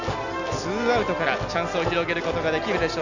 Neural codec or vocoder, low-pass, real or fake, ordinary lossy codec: none; 7.2 kHz; real; none